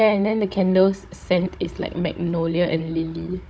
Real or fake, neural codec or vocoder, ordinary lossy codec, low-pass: fake; codec, 16 kHz, 16 kbps, FunCodec, trained on Chinese and English, 50 frames a second; none; none